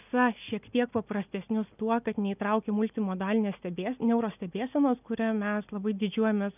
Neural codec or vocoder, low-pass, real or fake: none; 3.6 kHz; real